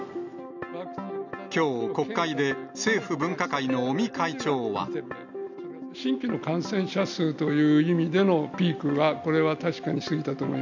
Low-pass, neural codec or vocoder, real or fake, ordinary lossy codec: 7.2 kHz; none; real; none